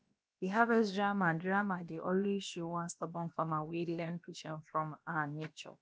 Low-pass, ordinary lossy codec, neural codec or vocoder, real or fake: none; none; codec, 16 kHz, about 1 kbps, DyCAST, with the encoder's durations; fake